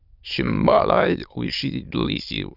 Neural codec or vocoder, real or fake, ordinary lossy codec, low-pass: autoencoder, 22.05 kHz, a latent of 192 numbers a frame, VITS, trained on many speakers; fake; Opus, 64 kbps; 5.4 kHz